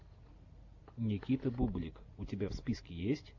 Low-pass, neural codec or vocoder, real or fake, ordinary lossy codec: 7.2 kHz; none; real; AAC, 48 kbps